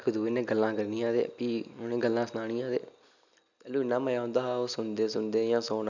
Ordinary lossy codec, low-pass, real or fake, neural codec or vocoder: none; 7.2 kHz; real; none